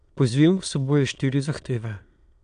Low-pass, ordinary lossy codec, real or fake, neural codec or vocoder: 9.9 kHz; none; fake; autoencoder, 22.05 kHz, a latent of 192 numbers a frame, VITS, trained on many speakers